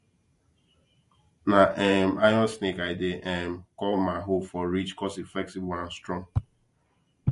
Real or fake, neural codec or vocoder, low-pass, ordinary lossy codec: fake; vocoder, 44.1 kHz, 128 mel bands every 512 samples, BigVGAN v2; 14.4 kHz; MP3, 48 kbps